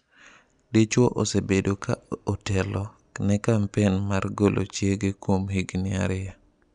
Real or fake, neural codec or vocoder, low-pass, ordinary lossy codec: real; none; 9.9 kHz; none